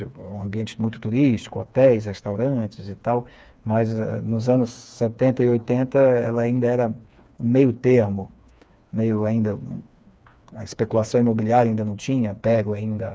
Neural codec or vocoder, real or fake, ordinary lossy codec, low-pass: codec, 16 kHz, 4 kbps, FreqCodec, smaller model; fake; none; none